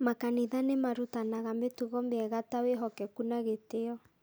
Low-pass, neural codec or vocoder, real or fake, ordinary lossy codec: none; none; real; none